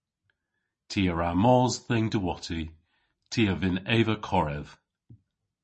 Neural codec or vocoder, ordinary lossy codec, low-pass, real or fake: none; MP3, 32 kbps; 10.8 kHz; real